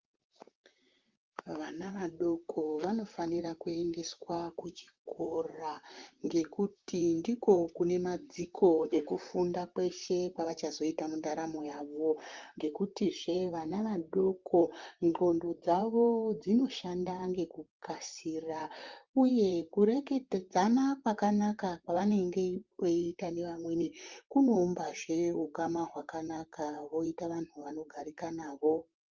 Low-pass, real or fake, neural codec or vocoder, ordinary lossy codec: 7.2 kHz; fake; vocoder, 44.1 kHz, 128 mel bands, Pupu-Vocoder; Opus, 32 kbps